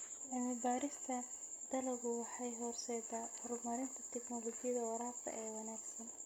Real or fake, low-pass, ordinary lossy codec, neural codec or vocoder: real; none; none; none